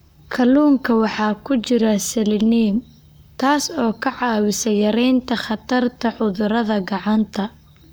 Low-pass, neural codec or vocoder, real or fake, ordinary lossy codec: none; codec, 44.1 kHz, 7.8 kbps, Pupu-Codec; fake; none